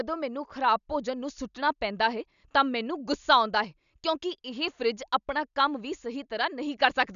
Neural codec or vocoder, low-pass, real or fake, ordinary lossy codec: none; 7.2 kHz; real; none